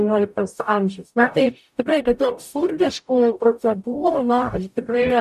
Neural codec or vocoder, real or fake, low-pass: codec, 44.1 kHz, 0.9 kbps, DAC; fake; 14.4 kHz